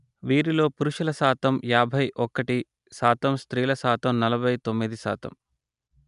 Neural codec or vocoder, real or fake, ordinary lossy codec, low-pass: none; real; none; 10.8 kHz